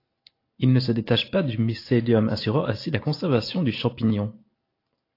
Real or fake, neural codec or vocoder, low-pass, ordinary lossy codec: real; none; 5.4 kHz; AAC, 32 kbps